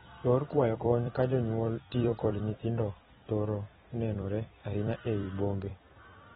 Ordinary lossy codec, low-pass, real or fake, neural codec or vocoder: AAC, 16 kbps; 19.8 kHz; fake; vocoder, 44.1 kHz, 128 mel bands every 256 samples, BigVGAN v2